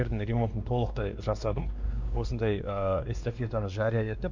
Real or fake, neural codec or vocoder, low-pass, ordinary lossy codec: fake; codec, 16 kHz, 2 kbps, X-Codec, WavLM features, trained on Multilingual LibriSpeech; 7.2 kHz; MP3, 64 kbps